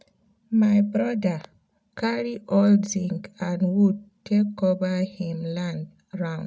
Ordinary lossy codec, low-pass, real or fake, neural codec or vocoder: none; none; real; none